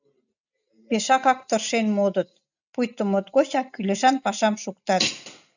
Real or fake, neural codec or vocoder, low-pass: real; none; 7.2 kHz